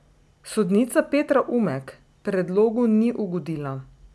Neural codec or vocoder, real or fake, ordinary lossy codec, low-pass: none; real; none; none